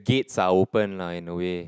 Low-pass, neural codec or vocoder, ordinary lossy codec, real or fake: none; none; none; real